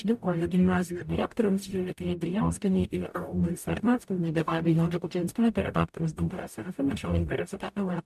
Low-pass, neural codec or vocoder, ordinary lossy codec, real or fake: 14.4 kHz; codec, 44.1 kHz, 0.9 kbps, DAC; MP3, 96 kbps; fake